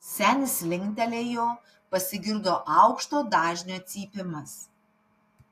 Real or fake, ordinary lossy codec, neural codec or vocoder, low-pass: real; AAC, 64 kbps; none; 14.4 kHz